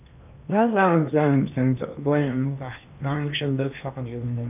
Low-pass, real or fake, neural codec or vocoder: 3.6 kHz; fake; codec, 16 kHz in and 24 kHz out, 0.8 kbps, FocalCodec, streaming, 65536 codes